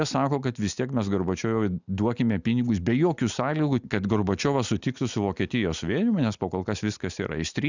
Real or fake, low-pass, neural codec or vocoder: real; 7.2 kHz; none